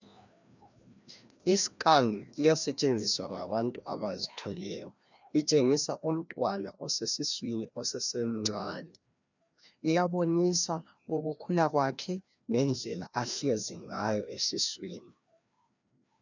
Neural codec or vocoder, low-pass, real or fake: codec, 16 kHz, 1 kbps, FreqCodec, larger model; 7.2 kHz; fake